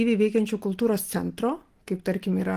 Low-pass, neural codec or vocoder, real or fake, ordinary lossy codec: 14.4 kHz; none; real; Opus, 24 kbps